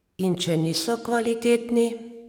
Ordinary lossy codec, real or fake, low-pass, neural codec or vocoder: none; fake; 19.8 kHz; codec, 44.1 kHz, 7.8 kbps, DAC